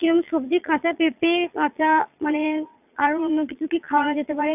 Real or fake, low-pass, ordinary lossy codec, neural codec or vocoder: fake; 3.6 kHz; none; vocoder, 22.05 kHz, 80 mel bands, Vocos